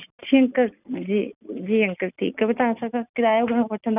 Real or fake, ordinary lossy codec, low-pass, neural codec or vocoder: real; none; 3.6 kHz; none